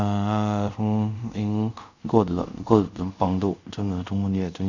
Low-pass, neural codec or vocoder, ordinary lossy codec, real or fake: 7.2 kHz; codec, 24 kHz, 0.5 kbps, DualCodec; none; fake